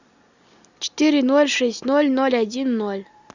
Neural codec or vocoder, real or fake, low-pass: none; real; 7.2 kHz